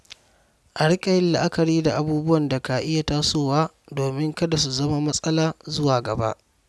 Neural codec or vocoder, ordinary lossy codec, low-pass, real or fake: none; none; none; real